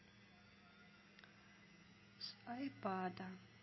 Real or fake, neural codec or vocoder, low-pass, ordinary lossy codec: real; none; 7.2 kHz; MP3, 24 kbps